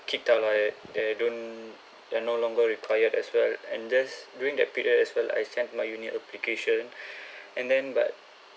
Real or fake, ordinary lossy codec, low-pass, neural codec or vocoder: real; none; none; none